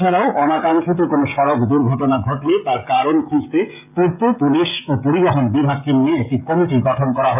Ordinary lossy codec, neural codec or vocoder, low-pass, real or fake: none; codec, 16 kHz, 16 kbps, FreqCodec, smaller model; 3.6 kHz; fake